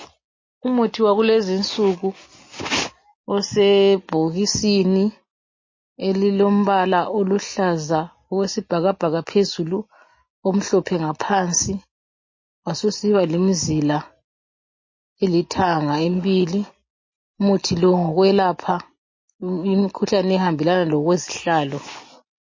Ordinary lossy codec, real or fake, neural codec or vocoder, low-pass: MP3, 32 kbps; real; none; 7.2 kHz